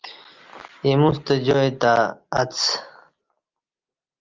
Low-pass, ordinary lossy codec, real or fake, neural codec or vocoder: 7.2 kHz; Opus, 32 kbps; real; none